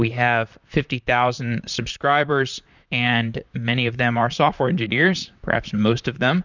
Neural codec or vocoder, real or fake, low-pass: vocoder, 44.1 kHz, 128 mel bands, Pupu-Vocoder; fake; 7.2 kHz